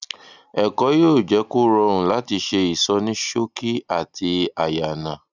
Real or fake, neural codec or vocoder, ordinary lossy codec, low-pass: real; none; none; 7.2 kHz